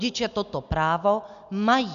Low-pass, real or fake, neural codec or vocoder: 7.2 kHz; real; none